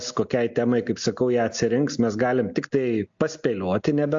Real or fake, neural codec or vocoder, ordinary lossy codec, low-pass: real; none; AAC, 64 kbps; 7.2 kHz